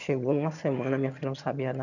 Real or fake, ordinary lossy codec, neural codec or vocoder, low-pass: fake; none; vocoder, 22.05 kHz, 80 mel bands, HiFi-GAN; 7.2 kHz